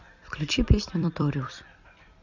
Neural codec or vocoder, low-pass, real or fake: vocoder, 44.1 kHz, 128 mel bands every 256 samples, BigVGAN v2; 7.2 kHz; fake